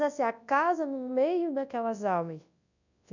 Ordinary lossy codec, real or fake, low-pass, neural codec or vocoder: none; fake; 7.2 kHz; codec, 24 kHz, 0.9 kbps, WavTokenizer, large speech release